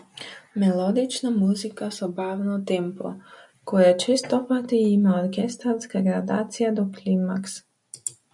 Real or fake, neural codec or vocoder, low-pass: real; none; 10.8 kHz